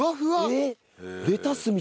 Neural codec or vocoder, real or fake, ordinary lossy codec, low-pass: none; real; none; none